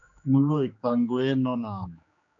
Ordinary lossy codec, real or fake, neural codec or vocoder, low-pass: MP3, 64 kbps; fake; codec, 16 kHz, 2 kbps, X-Codec, HuBERT features, trained on general audio; 7.2 kHz